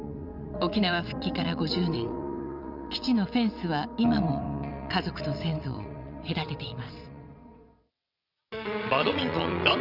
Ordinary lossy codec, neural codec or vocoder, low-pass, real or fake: none; vocoder, 22.05 kHz, 80 mel bands, WaveNeXt; 5.4 kHz; fake